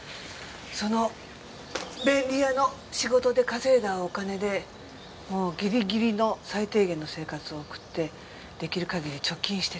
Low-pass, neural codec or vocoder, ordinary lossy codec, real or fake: none; none; none; real